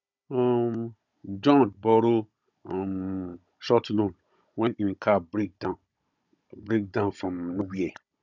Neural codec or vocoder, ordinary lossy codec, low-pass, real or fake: codec, 16 kHz, 16 kbps, FunCodec, trained on Chinese and English, 50 frames a second; none; 7.2 kHz; fake